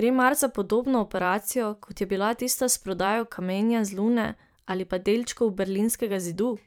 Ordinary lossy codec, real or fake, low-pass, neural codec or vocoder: none; fake; none; vocoder, 44.1 kHz, 128 mel bands every 512 samples, BigVGAN v2